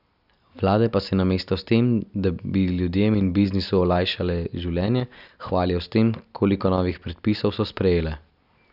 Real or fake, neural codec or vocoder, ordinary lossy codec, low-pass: real; none; none; 5.4 kHz